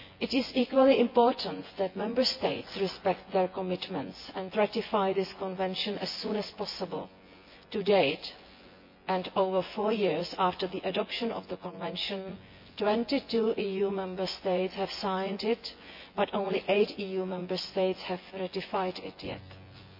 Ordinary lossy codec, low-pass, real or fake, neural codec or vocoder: MP3, 32 kbps; 5.4 kHz; fake; vocoder, 24 kHz, 100 mel bands, Vocos